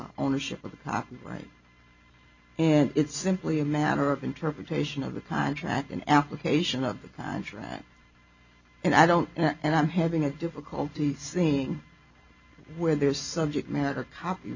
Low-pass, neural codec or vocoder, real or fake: 7.2 kHz; none; real